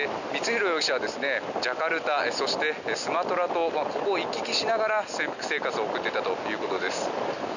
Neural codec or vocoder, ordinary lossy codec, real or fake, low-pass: none; none; real; 7.2 kHz